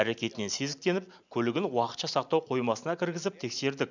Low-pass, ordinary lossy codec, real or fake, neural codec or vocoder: 7.2 kHz; none; real; none